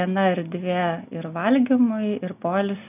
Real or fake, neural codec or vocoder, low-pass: real; none; 3.6 kHz